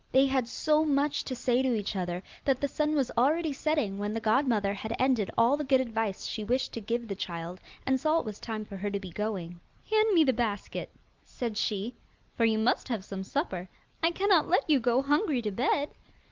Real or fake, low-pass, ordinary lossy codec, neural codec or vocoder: real; 7.2 kHz; Opus, 32 kbps; none